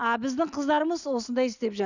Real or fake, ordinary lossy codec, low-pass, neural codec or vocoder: real; none; 7.2 kHz; none